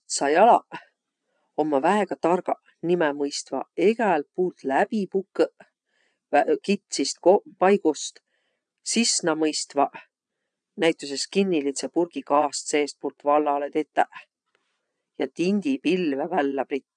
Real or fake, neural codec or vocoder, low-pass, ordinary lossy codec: fake; vocoder, 22.05 kHz, 80 mel bands, Vocos; 9.9 kHz; none